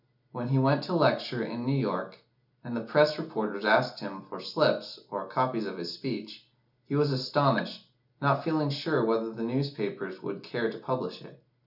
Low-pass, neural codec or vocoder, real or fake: 5.4 kHz; none; real